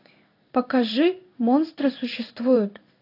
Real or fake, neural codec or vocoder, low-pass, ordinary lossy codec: fake; codec, 16 kHz in and 24 kHz out, 1 kbps, XY-Tokenizer; 5.4 kHz; AAC, 32 kbps